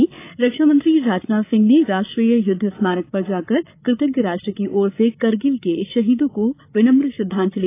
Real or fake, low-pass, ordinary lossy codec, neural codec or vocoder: fake; 3.6 kHz; AAC, 24 kbps; codec, 16 kHz, 8 kbps, FreqCodec, larger model